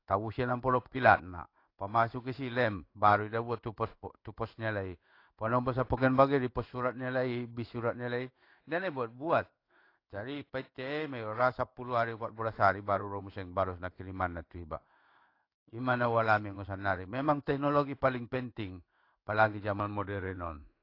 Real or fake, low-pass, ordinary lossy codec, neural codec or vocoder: fake; 5.4 kHz; AAC, 32 kbps; codec, 16 kHz in and 24 kHz out, 1 kbps, XY-Tokenizer